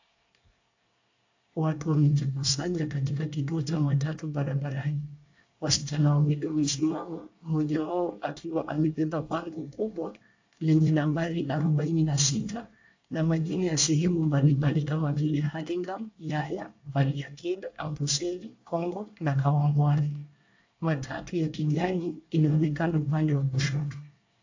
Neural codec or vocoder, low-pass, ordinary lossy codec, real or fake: codec, 24 kHz, 1 kbps, SNAC; 7.2 kHz; AAC, 48 kbps; fake